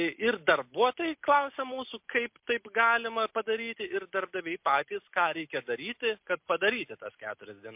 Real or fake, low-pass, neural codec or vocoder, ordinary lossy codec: real; 3.6 kHz; none; MP3, 32 kbps